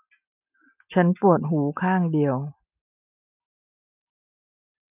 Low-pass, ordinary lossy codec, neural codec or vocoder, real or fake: 3.6 kHz; none; none; real